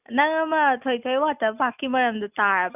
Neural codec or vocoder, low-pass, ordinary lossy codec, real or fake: none; 3.6 kHz; none; real